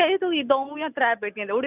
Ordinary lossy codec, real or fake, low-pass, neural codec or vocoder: none; real; 3.6 kHz; none